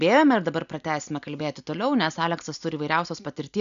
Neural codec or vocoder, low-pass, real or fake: none; 7.2 kHz; real